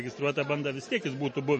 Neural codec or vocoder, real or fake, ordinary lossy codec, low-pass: none; real; MP3, 32 kbps; 10.8 kHz